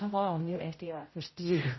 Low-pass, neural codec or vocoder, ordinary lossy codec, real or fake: 7.2 kHz; codec, 16 kHz, 0.5 kbps, X-Codec, HuBERT features, trained on general audio; MP3, 24 kbps; fake